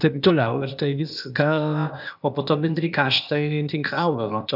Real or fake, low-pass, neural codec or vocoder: fake; 5.4 kHz; codec, 16 kHz, 0.8 kbps, ZipCodec